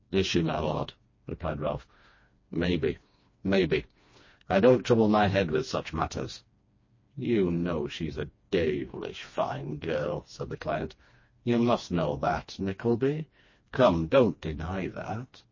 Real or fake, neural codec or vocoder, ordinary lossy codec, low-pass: fake; codec, 16 kHz, 2 kbps, FreqCodec, smaller model; MP3, 32 kbps; 7.2 kHz